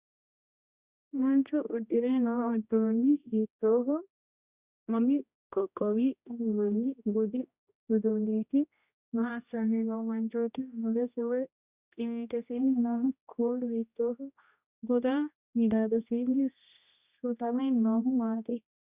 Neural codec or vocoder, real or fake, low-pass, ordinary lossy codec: codec, 16 kHz, 1 kbps, X-Codec, HuBERT features, trained on general audio; fake; 3.6 kHz; Opus, 64 kbps